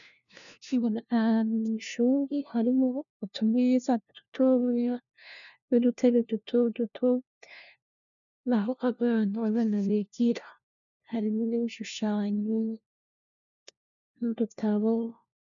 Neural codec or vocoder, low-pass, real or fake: codec, 16 kHz, 1 kbps, FunCodec, trained on LibriTTS, 50 frames a second; 7.2 kHz; fake